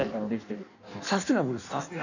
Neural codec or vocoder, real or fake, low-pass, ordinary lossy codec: codec, 16 kHz in and 24 kHz out, 1.1 kbps, FireRedTTS-2 codec; fake; 7.2 kHz; none